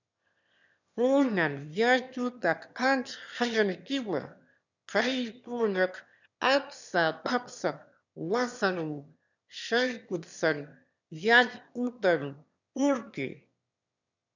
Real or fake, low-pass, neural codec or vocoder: fake; 7.2 kHz; autoencoder, 22.05 kHz, a latent of 192 numbers a frame, VITS, trained on one speaker